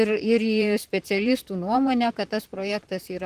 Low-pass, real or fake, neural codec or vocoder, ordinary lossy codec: 14.4 kHz; fake; vocoder, 44.1 kHz, 128 mel bands, Pupu-Vocoder; Opus, 24 kbps